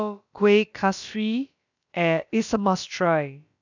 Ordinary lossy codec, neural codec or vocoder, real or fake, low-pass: none; codec, 16 kHz, about 1 kbps, DyCAST, with the encoder's durations; fake; 7.2 kHz